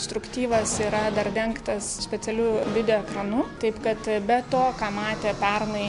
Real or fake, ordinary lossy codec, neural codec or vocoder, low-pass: real; MP3, 64 kbps; none; 10.8 kHz